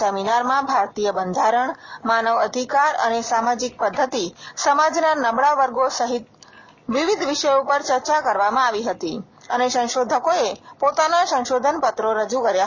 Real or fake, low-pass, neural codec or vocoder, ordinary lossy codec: real; 7.2 kHz; none; none